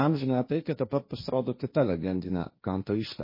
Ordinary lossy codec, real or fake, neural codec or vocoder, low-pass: MP3, 24 kbps; fake; codec, 16 kHz, 1.1 kbps, Voila-Tokenizer; 5.4 kHz